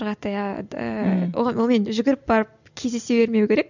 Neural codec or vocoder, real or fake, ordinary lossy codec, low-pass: none; real; none; 7.2 kHz